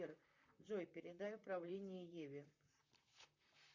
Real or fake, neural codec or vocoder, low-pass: fake; vocoder, 44.1 kHz, 128 mel bands, Pupu-Vocoder; 7.2 kHz